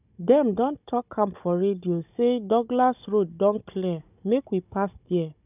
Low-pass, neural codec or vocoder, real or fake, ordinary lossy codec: 3.6 kHz; codec, 16 kHz, 16 kbps, FunCodec, trained on Chinese and English, 50 frames a second; fake; none